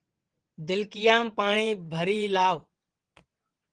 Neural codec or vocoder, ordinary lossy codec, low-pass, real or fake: vocoder, 22.05 kHz, 80 mel bands, WaveNeXt; Opus, 16 kbps; 9.9 kHz; fake